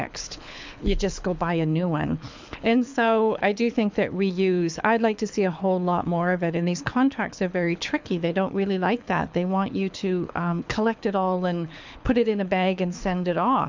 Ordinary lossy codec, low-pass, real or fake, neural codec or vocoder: MP3, 64 kbps; 7.2 kHz; fake; codec, 24 kHz, 6 kbps, HILCodec